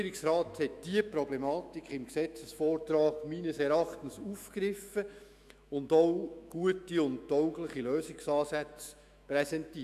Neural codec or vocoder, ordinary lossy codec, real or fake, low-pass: autoencoder, 48 kHz, 128 numbers a frame, DAC-VAE, trained on Japanese speech; none; fake; 14.4 kHz